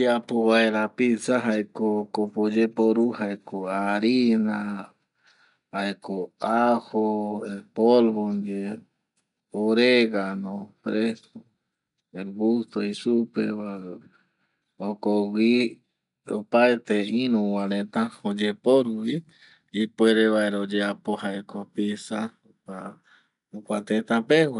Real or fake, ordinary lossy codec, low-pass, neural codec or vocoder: real; none; 10.8 kHz; none